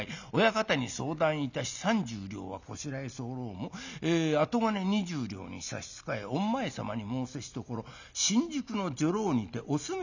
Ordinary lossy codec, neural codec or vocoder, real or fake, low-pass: none; none; real; 7.2 kHz